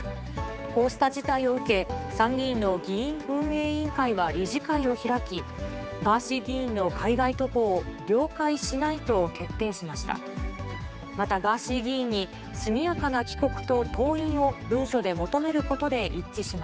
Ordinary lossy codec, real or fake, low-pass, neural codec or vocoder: none; fake; none; codec, 16 kHz, 4 kbps, X-Codec, HuBERT features, trained on general audio